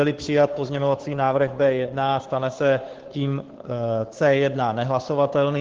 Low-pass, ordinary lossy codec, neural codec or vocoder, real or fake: 7.2 kHz; Opus, 16 kbps; codec, 16 kHz, 2 kbps, FunCodec, trained on Chinese and English, 25 frames a second; fake